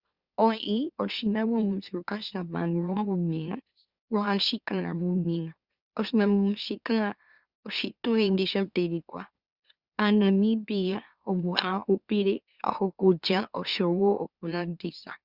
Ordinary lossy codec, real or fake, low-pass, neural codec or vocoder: Opus, 64 kbps; fake; 5.4 kHz; autoencoder, 44.1 kHz, a latent of 192 numbers a frame, MeloTTS